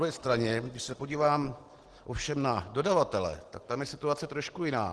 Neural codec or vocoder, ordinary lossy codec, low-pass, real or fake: none; Opus, 16 kbps; 10.8 kHz; real